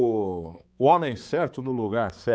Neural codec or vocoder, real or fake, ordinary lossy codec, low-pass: codec, 16 kHz, 4 kbps, X-Codec, HuBERT features, trained on balanced general audio; fake; none; none